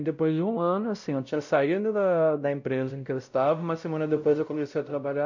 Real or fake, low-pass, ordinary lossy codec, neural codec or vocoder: fake; 7.2 kHz; none; codec, 16 kHz, 0.5 kbps, X-Codec, WavLM features, trained on Multilingual LibriSpeech